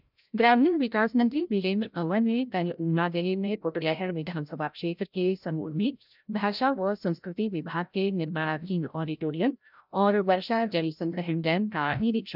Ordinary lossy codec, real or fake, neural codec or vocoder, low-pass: none; fake; codec, 16 kHz, 0.5 kbps, FreqCodec, larger model; 5.4 kHz